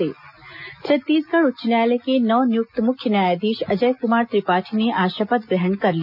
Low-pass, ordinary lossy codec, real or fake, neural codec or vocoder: 5.4 kHz; none; real; none